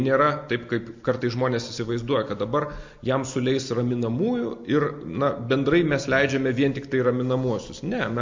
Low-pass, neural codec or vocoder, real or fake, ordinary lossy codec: 7.2 kHz; none; real; MP3, 48 kbps